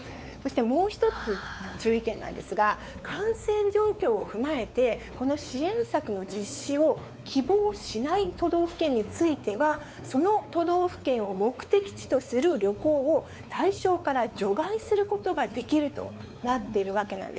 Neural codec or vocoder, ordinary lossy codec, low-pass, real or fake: codec, 16 kHz, 4 kbps, X-Codec, WavLM features, trained on Multilingual LibriSpeech; none; none; fake